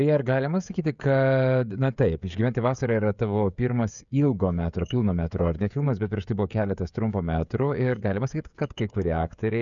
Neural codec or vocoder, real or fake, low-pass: codec, 16 kHz, 16 kbps, FreqCodec, smaller model; fake; 7.2 kHz